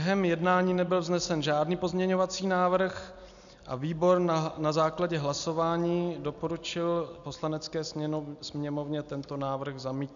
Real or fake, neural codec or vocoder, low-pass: real; none; 7.2 kHz